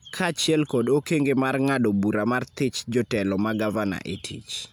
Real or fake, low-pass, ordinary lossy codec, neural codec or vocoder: real; none; none; none